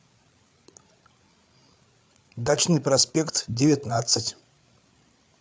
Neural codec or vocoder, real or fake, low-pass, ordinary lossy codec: codec, 16 kHz, 8 kbps, FreqCodec, larger model; fake; none; none